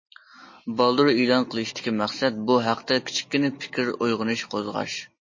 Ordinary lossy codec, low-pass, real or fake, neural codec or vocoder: MP3, 32 kbps; 7.2 kHz; real; none